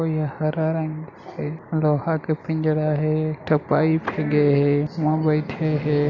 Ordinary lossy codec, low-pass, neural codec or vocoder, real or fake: none; 7.2 kHz; none; real